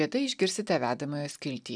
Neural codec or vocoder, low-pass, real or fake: none; 9.9 kHz; real